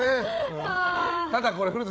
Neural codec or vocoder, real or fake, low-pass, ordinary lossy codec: codec, 16 kHz, 8 kbps, FreqCodec, larger model; fake; none; none